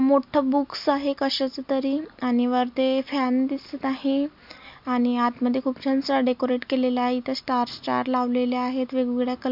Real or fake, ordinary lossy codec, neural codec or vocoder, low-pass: real; MP3, 48 kbps; none; 5.4 kHz